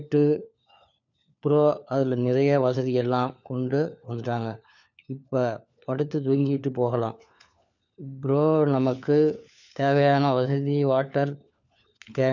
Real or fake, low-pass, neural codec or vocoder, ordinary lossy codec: fake; 7.2 kHz; codec, 16 kHz, 4 kbps, FunCodec, trained on LibriTTS, 50 frames a second; none